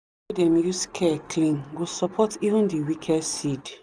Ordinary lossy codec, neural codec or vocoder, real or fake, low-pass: none; none; real; none